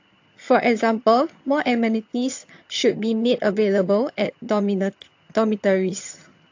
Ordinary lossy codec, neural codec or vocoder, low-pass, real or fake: AAC, 48 kbps; vocoder, 22.05 kHz, 80 mel bands, HiFi-GAN; 7.2 kHz; fake